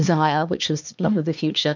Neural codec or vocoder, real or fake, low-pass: codec, 16 kHz, 2 kbps, X-Codec, HuBERT features, trained on LibriSpeech; fake; 7.2 kHz